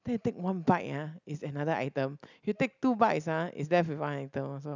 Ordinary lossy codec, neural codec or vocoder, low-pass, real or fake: none; none; 7.2 kHz; real